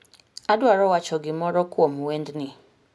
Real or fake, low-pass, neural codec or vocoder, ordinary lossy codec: real; none; none; none